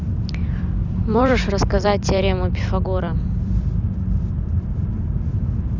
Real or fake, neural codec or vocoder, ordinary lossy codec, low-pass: fake; vocoder, 44.1 kHz, 128 mel bands every 256 samples, BigVGAN v2; none; 7.2 kHz